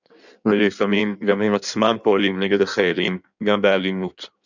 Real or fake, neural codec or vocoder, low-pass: fake; codec, 16 kHz in and 24 kHz out, 1.1 kbps, FireRedTTS-2 codec; 7.2 kHz